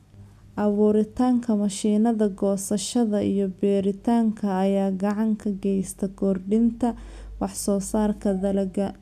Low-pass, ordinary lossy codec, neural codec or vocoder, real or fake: 14.4 kHz; none; none; real